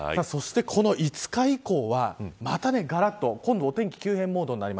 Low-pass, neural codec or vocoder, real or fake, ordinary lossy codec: none; none; real; none